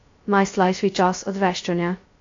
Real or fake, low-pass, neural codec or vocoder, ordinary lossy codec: fake; 7.2 kHz; codec, 16 kHz, 0.2 kbps, FocalCodec; AAC, 48 kbps